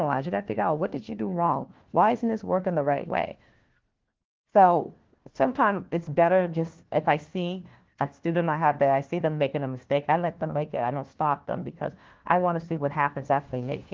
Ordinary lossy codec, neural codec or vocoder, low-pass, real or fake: Opus, 16 kbps; codec, 16 kHz, 1 kbps, FunCodec, trained on LibriTTS, 50 frames a second; 7.2 kHz; fake